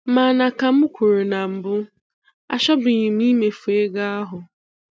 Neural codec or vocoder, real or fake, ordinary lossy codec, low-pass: none; real; none; none